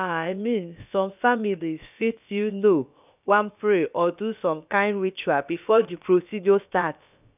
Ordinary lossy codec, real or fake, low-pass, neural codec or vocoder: none; fake; 3.6 kHz; codec, 16 kHz, about 1 kbps, DyCAST, with the encoder's durations